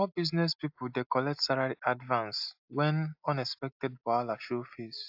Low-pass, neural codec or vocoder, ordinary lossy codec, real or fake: 5.4 kHz; none; AAC, 48 kbps; real